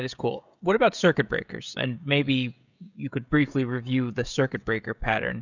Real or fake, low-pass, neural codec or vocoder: fake; 7.2 kHz; codec, 16 kHz, 16 kbps, FreqCodec, smaller model